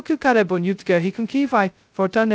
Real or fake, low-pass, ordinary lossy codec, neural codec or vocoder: fake; none; none; codec, 16 kHz, 0.2 kbps, FocalCodec